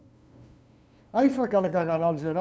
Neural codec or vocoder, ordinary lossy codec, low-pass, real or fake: codec, 16 kHz, 2 kbps, FunCodec, trained on LibriTTS, 25 frames a second; none; none; fake